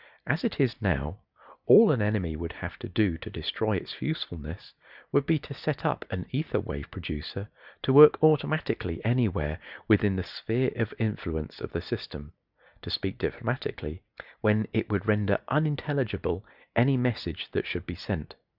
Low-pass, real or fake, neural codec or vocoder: 5.4 kHz; real; none